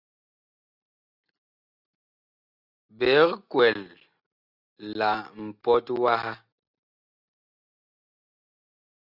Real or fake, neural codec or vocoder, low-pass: real; none; 5.4 kHz